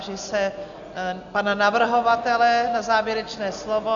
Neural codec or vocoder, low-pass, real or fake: none; 7.2 kHz; real